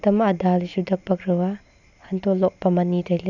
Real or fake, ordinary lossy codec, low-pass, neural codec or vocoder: real; none; 7.2 kHz; none